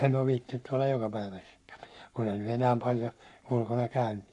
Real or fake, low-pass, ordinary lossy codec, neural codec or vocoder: fake; 10.8 kHz; none; codec, 44.1 kHz, 7.8 kbps, Pupu-Codec